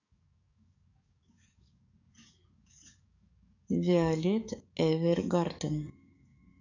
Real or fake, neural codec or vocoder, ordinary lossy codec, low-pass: fake; codec, 44.1 kHz, 7.8 kbps, DAC; none; 7.2 kHz